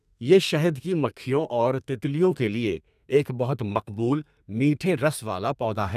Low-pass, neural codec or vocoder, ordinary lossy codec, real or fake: 14.4 kHz; codec, 32 kHz, 1.9 kbps, SNAC; none; fake